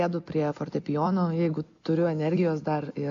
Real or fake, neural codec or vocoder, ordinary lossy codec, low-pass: real; none; AAC, 48 kbps; 7.2 kHz